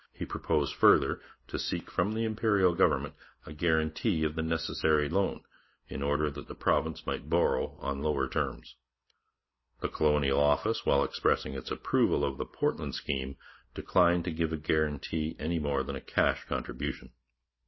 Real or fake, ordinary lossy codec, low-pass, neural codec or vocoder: real; MP3, 24 kbps; 7.2 kHz; none